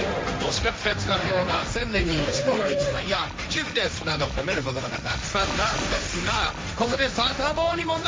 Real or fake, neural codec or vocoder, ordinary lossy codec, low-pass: fake; codec, 16 kHz, 1.1 kbps, Voila-Tokenizer; none; none